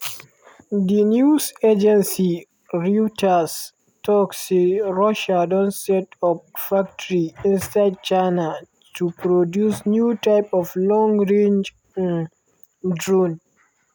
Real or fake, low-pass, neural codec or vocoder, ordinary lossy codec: real; none; none; none